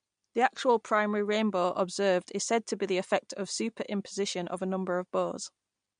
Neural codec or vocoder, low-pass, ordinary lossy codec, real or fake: none; 9.9 kHz; MP3, 64 kbps; real